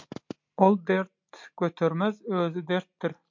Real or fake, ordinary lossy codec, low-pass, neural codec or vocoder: real; MP3, 64 kbps; 7.2 kHz; none